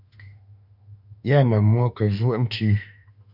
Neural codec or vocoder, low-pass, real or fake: autoencoder, 48 kHz, 32 numbers a frame, DAC-VAE, trained on Japanese speech; 5.4 kHz; fake